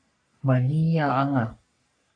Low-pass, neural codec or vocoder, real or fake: 9.9 kHz; codec, 44.1 kHz, 3.4 kbps, Pupu-Codec; fake